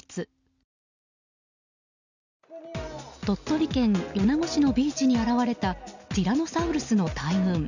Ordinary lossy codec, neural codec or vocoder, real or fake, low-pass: none; none; real; 7.2 kHz